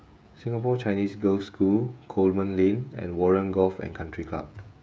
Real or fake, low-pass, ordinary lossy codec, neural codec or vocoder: fake; none; none; codec, 16 kHz, 16 kbps, FreqCodec, smaller model